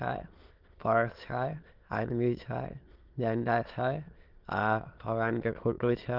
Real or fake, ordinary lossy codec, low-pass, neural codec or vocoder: fake; Opus, 16 kbps; 5.4 kHz; autoencoder, 22.05 kHz, a latent of 192 numbers a frame, VITS, trained on many speakers